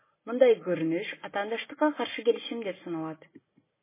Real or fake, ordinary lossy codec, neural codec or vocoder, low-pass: real; MP3, 16 kbps; none; 3.6 kHz